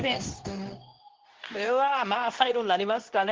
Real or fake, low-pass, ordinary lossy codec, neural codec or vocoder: fake; 7.2 kHz; Opus, 16 kbps; codec, 24 kHz, 0.9 kbps, WavTokenizer, medium speech release version 2